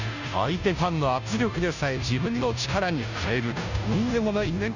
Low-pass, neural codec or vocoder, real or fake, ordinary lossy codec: 7.2 kHz; codec, 16 kHz, 0.5 kbps, FunCodec, trained on Chinese and English, 25 frames a second; fake; none